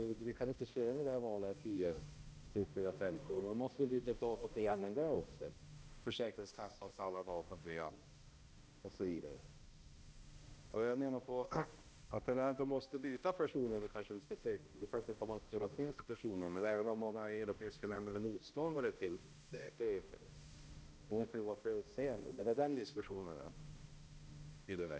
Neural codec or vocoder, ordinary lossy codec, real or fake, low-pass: codec, 16 kHz, 1 kbps, X-Codec, HuBERT features, trained on balanced general audio; none; fake; none